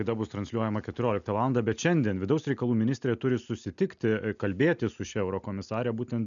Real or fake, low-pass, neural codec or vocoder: real; 7.2 kHz; none